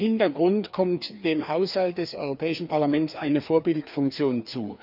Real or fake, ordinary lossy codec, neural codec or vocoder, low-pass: fake; none; codec, 16 kHz, 2 kbps, FreqCodec, larger model; 5.4 kHz